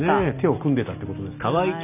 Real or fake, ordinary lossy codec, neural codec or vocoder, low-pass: real; none; none; 3.6 kHz